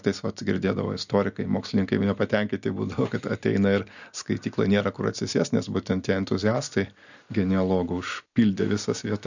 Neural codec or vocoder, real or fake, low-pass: none; real; 7.2 kHz